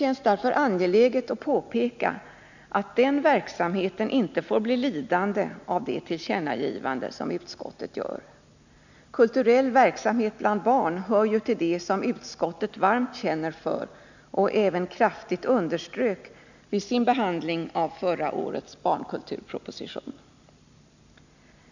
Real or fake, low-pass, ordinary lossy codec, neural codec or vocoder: real; 7.2 kHz; none; none